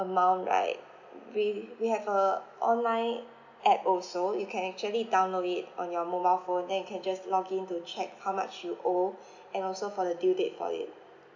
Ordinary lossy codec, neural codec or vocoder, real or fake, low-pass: none; none; real; 7.2 kHz